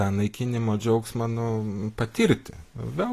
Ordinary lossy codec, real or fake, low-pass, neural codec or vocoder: AAC, 48 kbps; real; 14.4 kHz; none